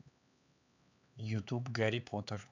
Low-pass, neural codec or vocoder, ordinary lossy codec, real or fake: 7.2 kHz; codec, 16 kHz, 4 kbps, X-Codec, HuBERT features, trained on LibriSpeech; none; fake